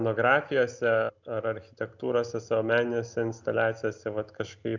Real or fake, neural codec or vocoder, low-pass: real; none; 7.2 kHz